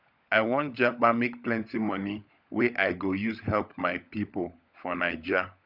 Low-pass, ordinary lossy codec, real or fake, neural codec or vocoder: 5.4 kHz; none; fake; codec, 16 kHz, 16 kbps, FunCodec, trained on LibriTTS, 50 frames a second